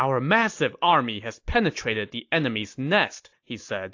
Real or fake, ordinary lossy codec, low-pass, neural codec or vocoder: real; AAC, 48 kbps; 7.2 kHz; none